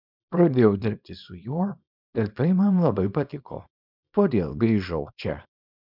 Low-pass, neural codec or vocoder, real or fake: 5.4 kHz; codec, 24 kHz, 0.9 kbps, WavTokenizer, small release; fake